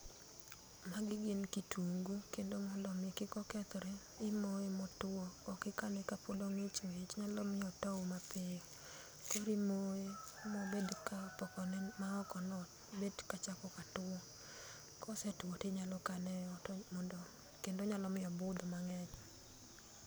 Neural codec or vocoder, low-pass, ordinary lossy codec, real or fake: none; none; none; real